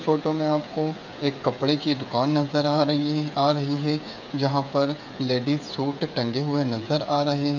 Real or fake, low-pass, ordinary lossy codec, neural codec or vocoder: fake; 7.2 kHz; none; codec, 16 kHz, 8 kbps, FreqCodec, smaller model